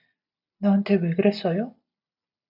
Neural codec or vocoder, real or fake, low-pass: none; real; 5.4 kHz